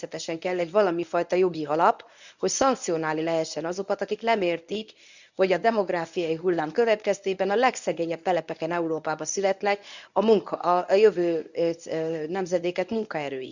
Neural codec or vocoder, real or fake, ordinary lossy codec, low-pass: codec, 24 kHz, 0.9 kbps, WavTokenizer, medium speech release version 1; fake; none; 7.2 kHz